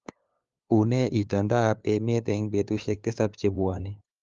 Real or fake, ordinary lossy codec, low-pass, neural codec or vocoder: fake; Opus, 32 kbps; 7.2 kHz; codec, 16 kHz, 2 kbps, FunCodec, trained on LibriTTS, 25 frames a second